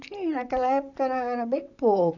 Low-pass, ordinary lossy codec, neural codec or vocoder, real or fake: 7.2 kHz; none; vocoder, 44.1 kHz, 128 mel bands, Pupu-Vocoder; fake